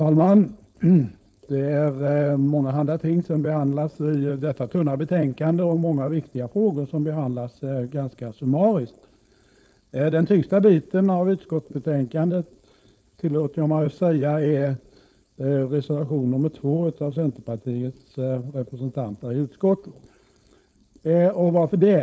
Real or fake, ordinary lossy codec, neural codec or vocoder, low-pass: fake; none; codec, 16 kHz, 4.8 kbps, FACodec; none